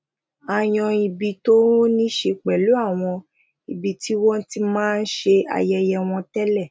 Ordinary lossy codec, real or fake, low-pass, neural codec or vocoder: none; real; none; none